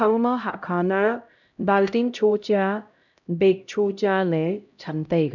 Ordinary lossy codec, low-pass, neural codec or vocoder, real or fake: none; 7.2 kHz; codec, 16 kHz, 0.5 kbps, X-Codec, HuBERT features, trained on LibriSpeech; fake